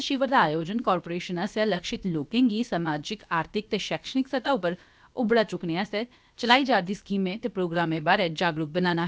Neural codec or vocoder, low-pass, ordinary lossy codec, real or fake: codec, 16 kHz, about 1 kbps, DyCAST, with the encoder's durations; none; none; fake